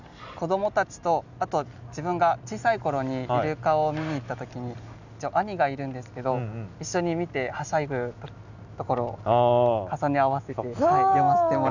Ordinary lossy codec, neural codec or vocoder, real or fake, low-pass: none; none; real; 7.2 kHz